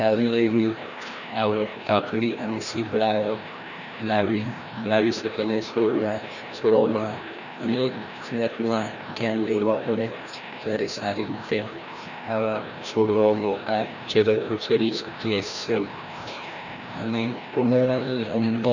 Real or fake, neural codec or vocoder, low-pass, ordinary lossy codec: fake; codec, 16 kHz, 1 kbps, FreqCodec, larger model; 7.2 kHz; none